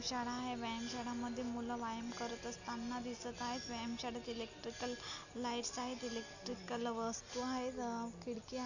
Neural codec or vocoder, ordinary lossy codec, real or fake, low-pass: none; none; real; 7.2 kHz